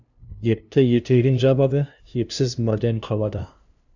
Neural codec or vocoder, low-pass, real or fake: codec, 16 kHz, 0.5 kbps, FunCodec, trained on LibriTTS, 25 frames a second; 7.2 kHz; fake